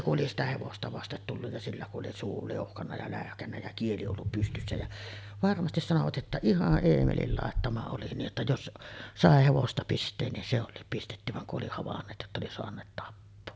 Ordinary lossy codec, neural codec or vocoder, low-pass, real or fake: none; none; none; real